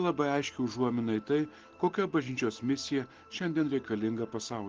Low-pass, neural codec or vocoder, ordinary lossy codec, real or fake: 7.2 kHz; none; Opus, 16 kbps; real